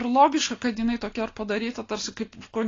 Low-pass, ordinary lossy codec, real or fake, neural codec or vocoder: 7.2 kHz; AAC, 32 kbps; real; none